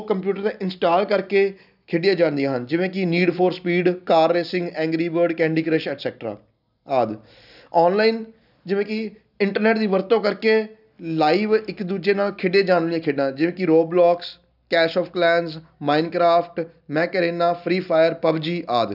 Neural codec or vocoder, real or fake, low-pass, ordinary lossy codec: none; real; 5.4 kHz; none